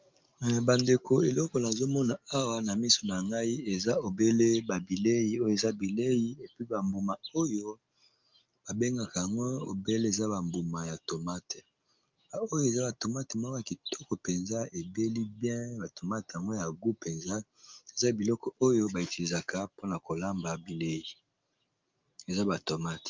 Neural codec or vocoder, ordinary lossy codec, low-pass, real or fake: none; Opus, 24 kbps; 7.2 kHz; real